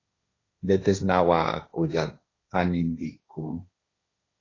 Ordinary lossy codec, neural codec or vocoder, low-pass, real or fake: AAC, 32 kbps; codec, 16 kHz, 1.1 kbps, Voila-Tokenizer; 7.2 kHz; fake